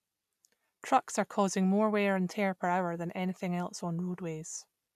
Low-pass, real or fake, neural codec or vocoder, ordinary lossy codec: 14.4 kHz; real; none; none